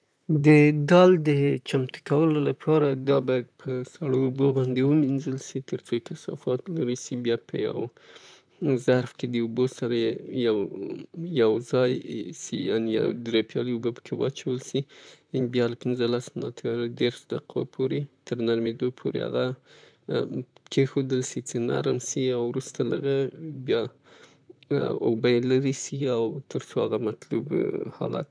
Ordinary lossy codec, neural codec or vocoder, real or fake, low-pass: none; vocoder, 44.1 kHz, 128 mel bands, Pupu-Vocoder; fake; 9.9 kHz